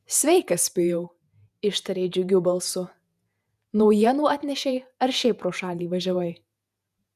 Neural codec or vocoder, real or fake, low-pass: vocoder, 48 kHz, 128 mel bands, Vocos; fake; 14.4 kHz